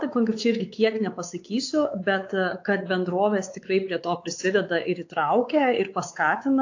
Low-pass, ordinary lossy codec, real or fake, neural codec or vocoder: 7.2 kHz; AAC, 48 kbps; fake; codec, 16 kHz, 4 kbps, X-Codec, WavLM features, trained on Multilingual LibriSpeech